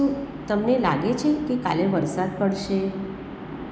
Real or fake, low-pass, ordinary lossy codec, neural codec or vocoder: real; none; none; none